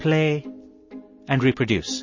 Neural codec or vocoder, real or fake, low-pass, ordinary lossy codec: none; real; 7.2 kHz; MP3, 32 kbps